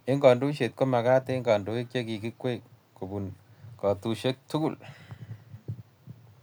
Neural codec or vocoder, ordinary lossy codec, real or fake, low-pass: none; none; real; none